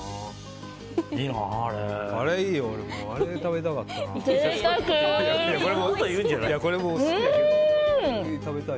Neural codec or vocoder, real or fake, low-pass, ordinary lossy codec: none; real; none; none